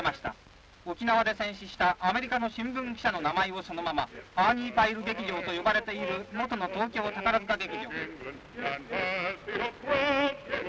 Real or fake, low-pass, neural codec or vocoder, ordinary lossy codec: real; none; none; none